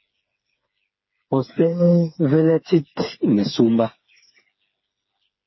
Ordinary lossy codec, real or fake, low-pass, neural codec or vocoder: MP3, 24 kbps; fake; 7.2 kHz; codec, 16 kHz, 4 kbps, FreqCodec, smaller model